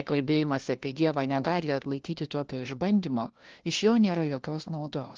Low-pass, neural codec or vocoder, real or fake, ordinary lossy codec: 7.2 kHz; codec, 16 kHz, 1 kbps, FunCodec, trained on LibriTTS, 50 frames a second; fake; Opus, 16 kbps